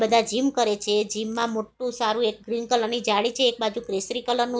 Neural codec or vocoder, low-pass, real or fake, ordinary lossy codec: none; none; real; none